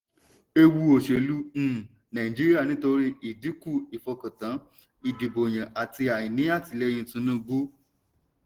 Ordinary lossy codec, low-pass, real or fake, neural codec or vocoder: Opus, 16 kbps; 19.8 kHz; real; none